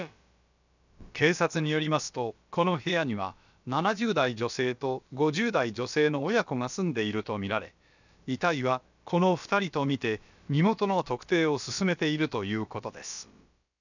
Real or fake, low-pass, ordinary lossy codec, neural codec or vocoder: fake; 7.2 kHz; none; codec, 16 kHz, about 1 kbps, DyCAST, with the encoder's durations